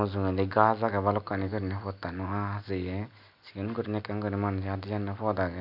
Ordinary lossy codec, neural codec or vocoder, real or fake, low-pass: none; none; real; 5.4 kHz